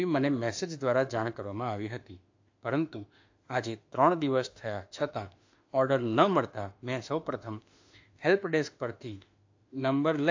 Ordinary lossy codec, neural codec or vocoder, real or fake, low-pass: AAC, 48 kbps; autoencoder, 48 kHz, 32 numbers a frame, DAC-VAE, trained on Japanese speech; fake; 7.2 kHz